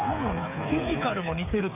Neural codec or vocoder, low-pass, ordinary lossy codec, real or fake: codec, 16 kHz, 8 kbps, FreqCodec, smaller model; 3.6 kHz; none; fake